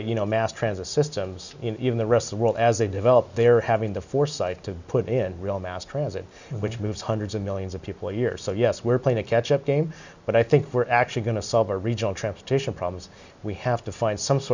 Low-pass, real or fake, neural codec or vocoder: 7.2 kHz; real; none